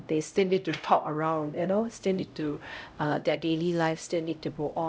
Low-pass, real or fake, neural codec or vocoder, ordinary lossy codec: none; fake; codec, 16 kHz, 0.5 kbps, X-Codec, HuBERT features, trained on LibriSpeech; none